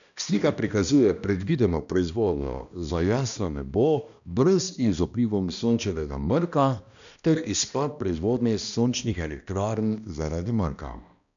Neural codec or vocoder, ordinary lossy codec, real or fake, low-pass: codec, 16 kHz, 1 kbps, X-Codec, HuBERT features, trained on balanced general audio; none; fake; 7.2 kHz